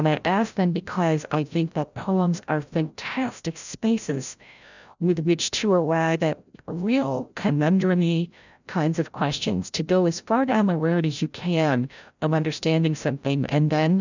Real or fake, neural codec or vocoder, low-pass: fake; codec, 16 kHz, 0.5 kbps, FreqCodec, larger model; 7.2 kHz